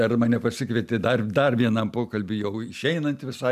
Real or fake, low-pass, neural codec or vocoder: real; 14.4 kHz; none